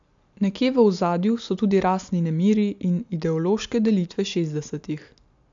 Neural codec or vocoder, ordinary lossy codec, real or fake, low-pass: none; AAC, 64 kbps; real; 7.2 kHz